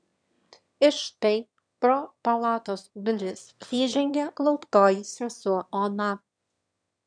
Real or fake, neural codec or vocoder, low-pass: fake; autoencoder, 22.05 kHz, a latent of 192 numbers a frame, VITS, trained on one speaker; 9.9 kHz